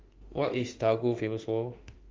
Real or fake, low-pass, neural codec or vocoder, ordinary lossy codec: fake; 7.2 kHz; autoencoder, 48 kHz, 32 numbers a frame, DAC-VAE, trained on Japanese speech; Opus, 32 kbps